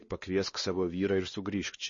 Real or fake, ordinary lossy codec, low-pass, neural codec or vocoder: fake; MP3, 32 kbps; 7.2 kHz; codec, 16 kHz, 2 kbps, X-Codec, WavLM features, trained on Multilingual LibriSpeech